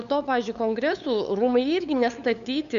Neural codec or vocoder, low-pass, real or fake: codec, 16 kHz, 8 kbps, FunCodec, trained on LibriTTS, 25 frames a second; 7.2 kHz; fake